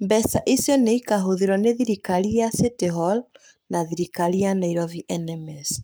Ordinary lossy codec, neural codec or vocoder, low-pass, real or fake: none; codec, 44.1 kHz, 7.8 kbps, Pupu-Codec; none; fake